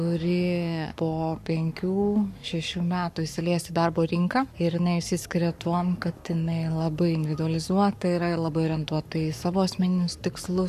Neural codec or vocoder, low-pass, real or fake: codec, 44.1 kHz, 7.8 kbps, Pupu-Codec; 14.4 kHz; fake